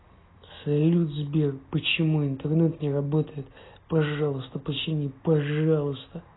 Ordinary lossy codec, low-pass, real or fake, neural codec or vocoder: AAC, 16 kbps; 7.2 kHz; real; none